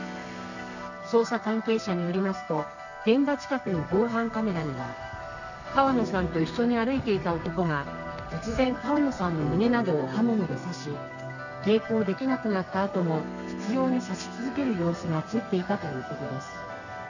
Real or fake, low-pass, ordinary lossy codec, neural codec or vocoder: fake; 7.2 kHz; none; codec, 32 kHz, 1.9 kbps, SNAC